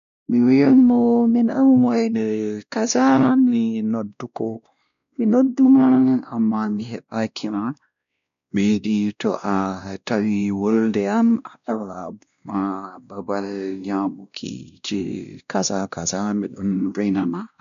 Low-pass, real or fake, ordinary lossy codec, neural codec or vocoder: 7.2 kHz; fake; none; codec, 16 kHz, 1 kbps, X-Codec, WavLM features, trained on Multilingual LibriSpeech